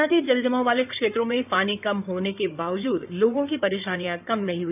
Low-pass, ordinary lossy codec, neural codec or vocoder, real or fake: 3.6 kHz; none; codec, 16 kHz in and 24 kHz out, 2.2 kbps, FireRedTTS-2 codec; fake